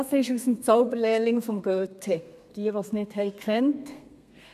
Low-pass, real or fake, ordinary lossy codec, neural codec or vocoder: 14.4 kHz; fake; none; codec, 32 kHz, 1.9 kbps, SNAC